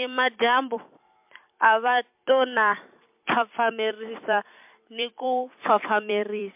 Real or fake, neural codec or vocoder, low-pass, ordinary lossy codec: fake; vocoder, 44.1 kHz, 128 mel bands every 256 samples, BigVGAN v2; 3.6 kHz; MP3, 32 kbps